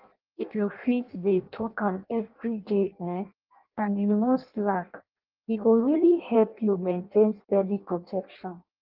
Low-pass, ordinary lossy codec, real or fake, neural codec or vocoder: 5.4 kHz; Opus, 32 kbps; fake; codec, 16 kHz in and 24 kHz out, 0.6 kbps, FireRedTTS-2 codec